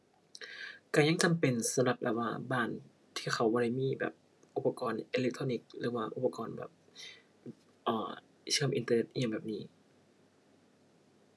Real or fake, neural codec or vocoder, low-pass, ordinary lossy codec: real; none; none; none